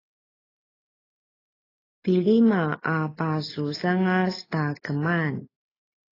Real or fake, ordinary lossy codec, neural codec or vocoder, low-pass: real; AAC, 24 kbps; none; 5.4 kHz